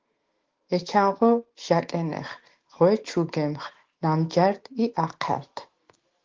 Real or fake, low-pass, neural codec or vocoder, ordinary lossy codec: fake; 7.2 kHz; autoencoder, 48 kHz, 128 numbers a frame, DAC-VAE, trained on Japanese speech; Opus, 16 kbps